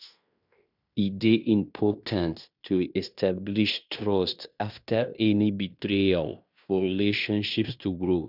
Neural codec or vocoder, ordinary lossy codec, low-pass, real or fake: codec, 16 kHz in and 24 kHz out, 0.9 kbps, LongCat-Audio-Codec, fine tuned four codebook decoder; none; 5.4 kHz; fake